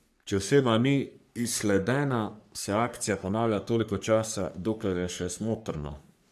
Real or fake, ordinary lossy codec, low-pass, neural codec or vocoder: fake; AAC, 96 kbps; 14.4 kHz; codec, 44.1 kHz, 3.4 kbps, Pupu-Codec